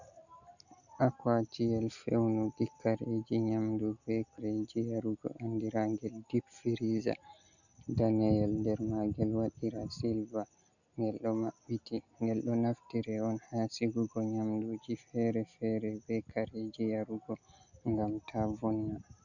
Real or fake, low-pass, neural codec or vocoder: real; 7.2 kHz; none